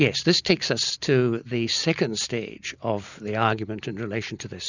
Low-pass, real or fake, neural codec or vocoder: 7.2 kHz; real; none